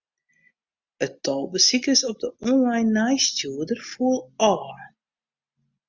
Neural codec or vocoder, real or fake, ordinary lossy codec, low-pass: none; real; Opus, 64 kbps; 7.2 kHz